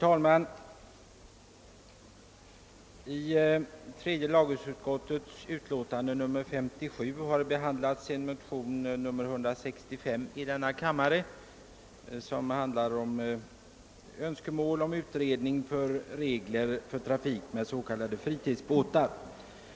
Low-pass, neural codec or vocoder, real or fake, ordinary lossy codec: none; none; real; none